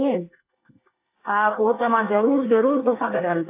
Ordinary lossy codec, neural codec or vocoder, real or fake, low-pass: AAC, 24 kbps; codec, 24 kHz, 1 kbps, SNAC; fake; 3.6 kHz